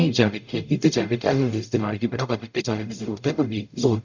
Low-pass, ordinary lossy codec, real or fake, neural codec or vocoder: 7.2 kHz; none; fake; codec, 44.1 kHz, 0.9 kbps, DAC